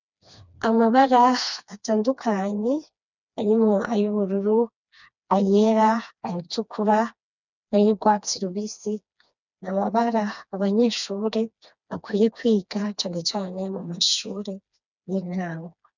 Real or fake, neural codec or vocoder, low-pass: fake; codec, 16 kHz, 2 kbps, FreqCodec, smaller model; 7.2 kHz